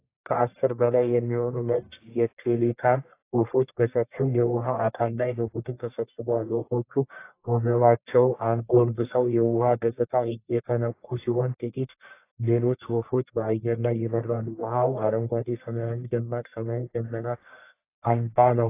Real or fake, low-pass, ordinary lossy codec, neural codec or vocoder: fake; 3.6 kHz; AAC, 24 kbps; codec, 44.1 kHz, 1.7 kbps, Pupu-Codec